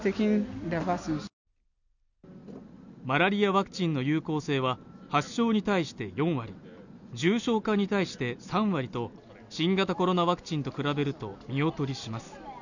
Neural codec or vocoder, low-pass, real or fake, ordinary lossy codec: none; 7.2 kHz; real; none